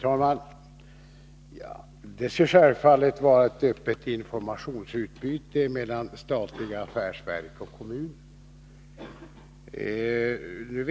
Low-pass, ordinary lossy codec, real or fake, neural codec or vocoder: none; none; real; none